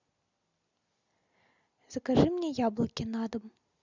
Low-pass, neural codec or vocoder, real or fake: 7.2 kHz; none; real